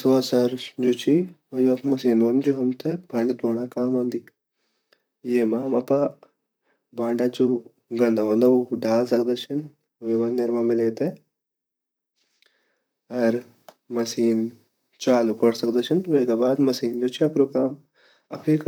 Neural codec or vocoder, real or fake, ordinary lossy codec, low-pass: vocoder, 44.1 kHz, 128 mel bands, Pupu-Vocoder; fake; none; none